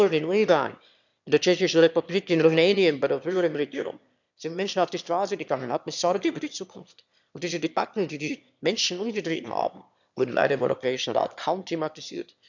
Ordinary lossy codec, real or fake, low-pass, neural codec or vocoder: none; fake; 7.2 kHz; autoencoder, 22.05 kHz, a latent of 192 numbers a frame, VITS, trained on one speaker